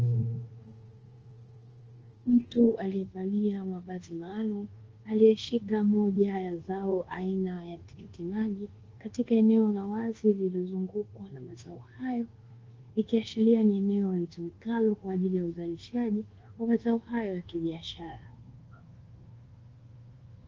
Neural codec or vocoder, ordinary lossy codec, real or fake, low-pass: codec, 24 kHz, 1.2 kbps, DualCodec; Opus, 16 kbps; fake; 7.2 kHz